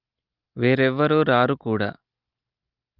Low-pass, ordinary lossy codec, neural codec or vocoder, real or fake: 5.4 kHz; Opus, 32 kbps; none; real